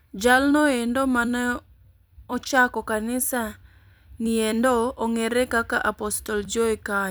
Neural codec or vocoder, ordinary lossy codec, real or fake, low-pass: vocoder, 44.1 kHz, 128 mel bands every 256 samples, BigVGAN v2; none; fake; none